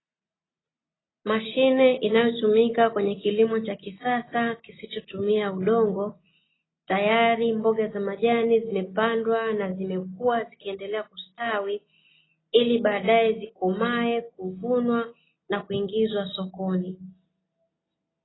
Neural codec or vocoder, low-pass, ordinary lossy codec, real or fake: none; 7.2 kHz; AAC, 16 kbps; real